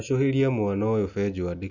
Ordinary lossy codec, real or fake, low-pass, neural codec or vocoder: none; real; 7.2 kHz; none